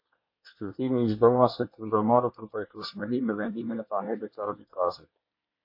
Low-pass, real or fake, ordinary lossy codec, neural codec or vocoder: 5.4 kHz; fake; MP3, 32 kbps; codec, 24 kHz, 1 kbps, SNAC